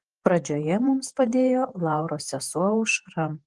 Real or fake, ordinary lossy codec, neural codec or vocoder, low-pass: fake; Opus, 24 kbps; vocoder, 48 kHz, 128 mel bands, Vocos; 10.8 kHz